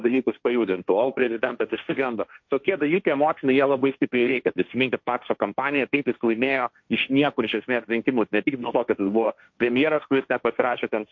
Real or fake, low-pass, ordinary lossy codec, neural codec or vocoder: fake; 7.2 kHz; MP3, 48 kbps; codec, 16 kHz, 1.1 kbps, Voila-Tokenizer